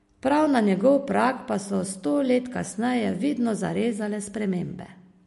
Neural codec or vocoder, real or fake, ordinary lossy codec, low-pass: none; real; MP3, 48 kbps; 14.4 kHz